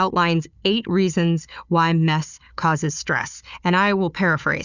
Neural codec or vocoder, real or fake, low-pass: codec, 16 kHz, 4 kbps, FunCodec, trained on Chinese and English, 50 frames a second; fake; 7.2 kHz